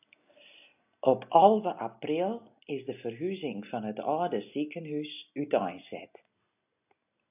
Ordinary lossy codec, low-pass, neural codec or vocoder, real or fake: AAC, 32 kbps; 3.6 kHz; none; real